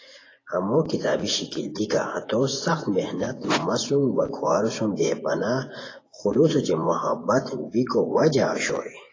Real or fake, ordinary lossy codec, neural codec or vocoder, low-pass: fake; AAC, 32 kbps; vocoder, 24 kHz, 100 mel bands, Vocos; 7.2 kHz